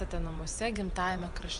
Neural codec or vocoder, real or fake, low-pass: vocoder, 24 kHz, 100 mel bands, Vocos; fake; 10.8 kHz